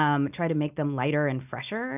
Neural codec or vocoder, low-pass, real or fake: none; 3.6 kHz; real